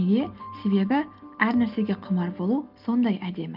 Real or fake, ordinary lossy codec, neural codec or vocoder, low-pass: real; Opus, 24 kbps; none; 5.4 kHz